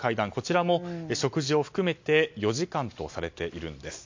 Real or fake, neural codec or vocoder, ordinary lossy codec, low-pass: real; none; MP3, 48 kbps; 7.2 kHz